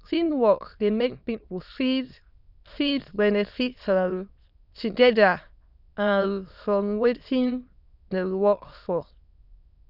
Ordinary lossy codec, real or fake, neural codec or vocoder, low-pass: none; fake; autoencoder, 22.05 kHz, a latent of 192 numbers a frame, VITS, trained on many speakers; 5.4 kHz